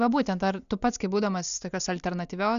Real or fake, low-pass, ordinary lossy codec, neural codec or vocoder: real; 7.2 kHz; MP3, 96 kbps; none